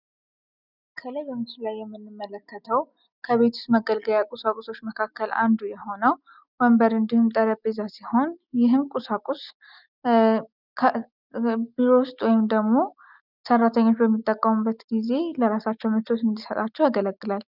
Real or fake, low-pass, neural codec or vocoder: real; 5.4 kHz; none